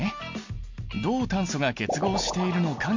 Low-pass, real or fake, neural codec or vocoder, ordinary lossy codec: 7.2 kHz; real; none; MP3, 48 kbps